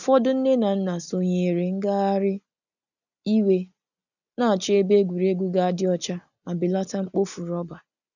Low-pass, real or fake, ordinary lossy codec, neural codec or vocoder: 7.2 kHz; real; none; none